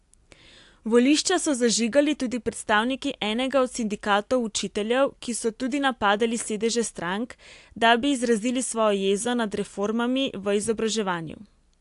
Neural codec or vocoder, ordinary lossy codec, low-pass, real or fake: none; AAC, 64 kbps; 10.8 kHz; real